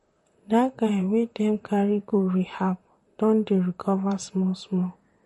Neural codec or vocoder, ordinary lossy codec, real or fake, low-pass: vocoder, 44.1 kHz, 128 mel bands, Pupu-Vocoder; MP3, 48 kbps; fake; 19.8 kHz